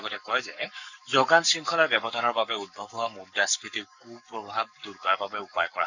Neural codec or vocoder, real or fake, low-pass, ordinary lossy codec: codec, 44.1 kHz, 7.8 kbps, Pupu-Codec; fake; 7.2 kHz; none